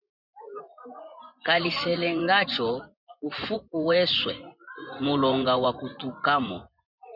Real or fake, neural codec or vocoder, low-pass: fake; vocoder, 24 kHz, 100 mel bands, Vocos; 5.4 kHz